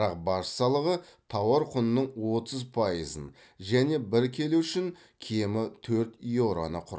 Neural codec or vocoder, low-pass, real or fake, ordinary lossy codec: none; none; real; none